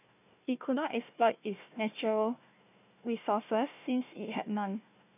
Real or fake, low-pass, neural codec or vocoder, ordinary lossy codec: fake; 3.6 kHz; codec, 16 kHz, 1 kbps, FunCodec, trained on Chinese and English, 50 frames a second; none